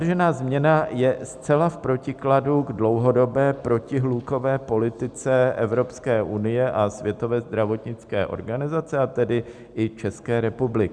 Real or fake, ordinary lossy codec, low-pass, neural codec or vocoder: real; Opus, 64 kbps; 9.9 kHz; none